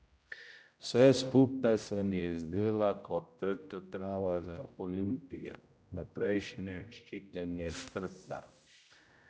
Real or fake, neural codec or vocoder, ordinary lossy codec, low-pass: fake; codec, 16 kHz, 0.5 kbps, X-Codec, HuBERT features, trained on general audio; none; none